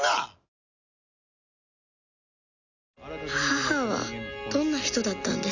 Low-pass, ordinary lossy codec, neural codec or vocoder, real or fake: 7.2 kHz; none; none; real